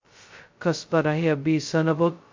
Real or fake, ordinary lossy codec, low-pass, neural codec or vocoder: fake; MP3, 48 kbps; 7.2 kHz; codec, 16 kHz, 0.2 kbps, FocalCodec